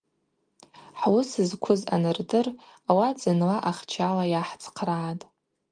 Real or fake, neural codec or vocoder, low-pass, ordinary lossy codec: real; none; 9.9 kHz; Opus, 32 kbps